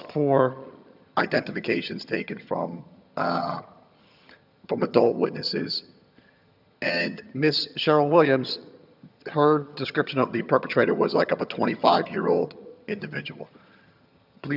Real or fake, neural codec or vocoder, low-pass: fake; vocoder, 22.05 kHz, 80 mel bands, HiFi-GAN; 5.4 kHz